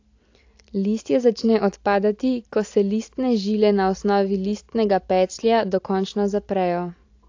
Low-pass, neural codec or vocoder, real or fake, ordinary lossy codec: 7.2 kHz; none; real; AAC, 48 kbps